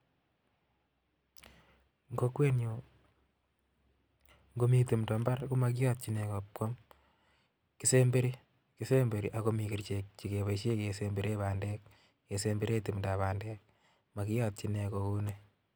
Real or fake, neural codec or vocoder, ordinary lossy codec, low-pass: real; none; none; none